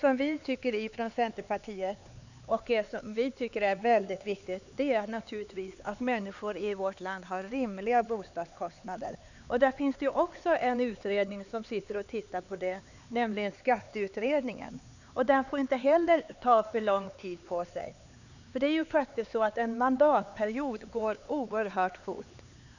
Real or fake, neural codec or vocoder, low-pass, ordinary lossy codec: fake; codec, 16 kHz, 4 kbps, X-Codec, HuBERT features, trained on LibriSpeech; 7.2 kHz; none